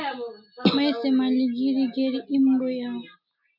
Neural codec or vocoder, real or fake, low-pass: none; real; 5.4 kHz